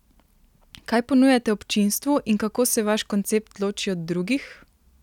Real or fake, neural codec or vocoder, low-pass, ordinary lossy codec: real; none; 19.8 kHz; none